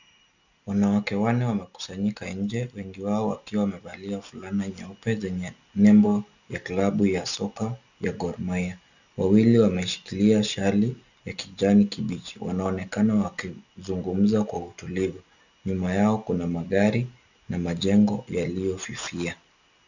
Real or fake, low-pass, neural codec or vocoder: real; 7.2 kHz; none